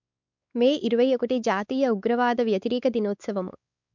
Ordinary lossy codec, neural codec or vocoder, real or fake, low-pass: MP3, 64 kbps; codec, 16 kHz, 4 kbps, X-Codec, WavLM features, trained on Multilingual LibriSpeech; fake; 7.2 kHz